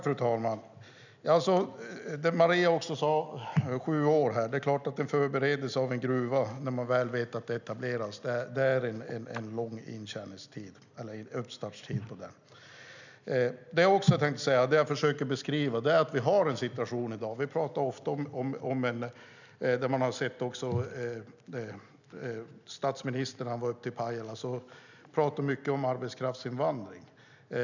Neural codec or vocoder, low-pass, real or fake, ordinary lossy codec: none; 7.2 kHz; real; none